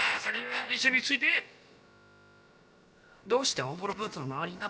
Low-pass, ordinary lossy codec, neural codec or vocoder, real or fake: none; none; codec, 16 kHz, about 1 kbps, DyCAST, with the encoder's durations; fake